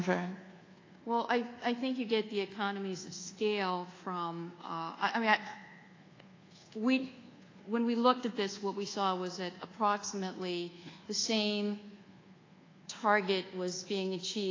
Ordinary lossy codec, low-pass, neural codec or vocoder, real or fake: AAC, 32 kbps; 7.2 kHz; codec, 24 kHz, 1.2 kbps, DualCodec; fake